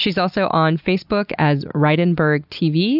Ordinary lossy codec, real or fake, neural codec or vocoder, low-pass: AAC, 48 kbps; real; none; 5.4 kHz